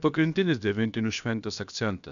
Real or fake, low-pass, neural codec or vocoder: fake; 7.2 kHz; codec, 16 kHz, about 1 kbps, DyCAST, with the encoder's durations